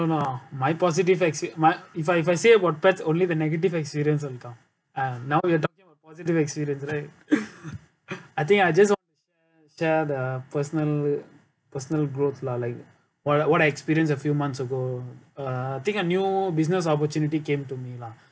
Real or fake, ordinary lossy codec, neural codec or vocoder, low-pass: real; none; none; none